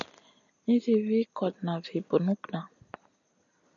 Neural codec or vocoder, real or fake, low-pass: none; real; 7.2 kHz